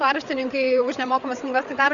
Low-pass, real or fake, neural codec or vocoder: 7.2 kHz; fake; codec, 16 kHz, 8 kbps, FreqCodec, larger model